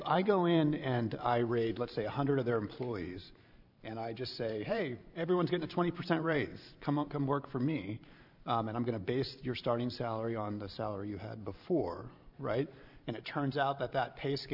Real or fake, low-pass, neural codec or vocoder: fake; 5.4 kHz; vocoder, 44.1 kHz, 128 mel bands every 512 samples, BigVGAN v2